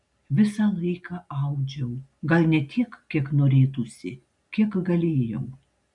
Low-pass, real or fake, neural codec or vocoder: 10.8 kHz; real; none